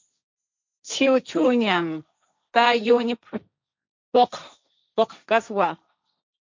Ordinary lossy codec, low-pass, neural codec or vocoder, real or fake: MP3, 64 kbps; 7.2 kHz; codec, 16 kHz, 1.1 kbps, Voila-Tokenizer; fake